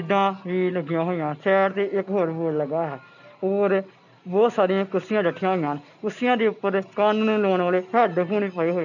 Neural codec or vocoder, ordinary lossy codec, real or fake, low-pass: none; none; real; 7.2 kHz